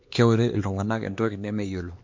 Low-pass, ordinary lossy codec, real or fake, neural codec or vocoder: 7.2 kHz; MP3, 48 kbps; fake; codec, 16 kHz, 2 kbps, X-Codec, HuBERT features, trained on LibriSpeech